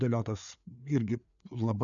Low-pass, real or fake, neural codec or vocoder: 7.2 kHz; fake; codec, 16 kHz, 4 kbps, FreqCodec, larger model